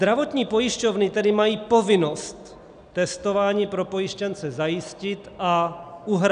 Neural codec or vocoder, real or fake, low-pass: none; real; 9.9 kHz